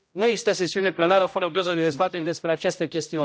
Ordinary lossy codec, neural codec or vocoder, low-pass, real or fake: none; codec, 16 kHz, 0.5 kbps, X-Codec, HuBERT features, trained on general audio; none; fake